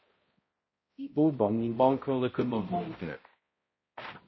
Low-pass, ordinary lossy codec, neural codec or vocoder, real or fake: 7.2 kHz; MP3, 24 kbps; codec, 16 kHz, 0.5 kbps, X-Codec, HuBERT features, trained on balanced general audio; fake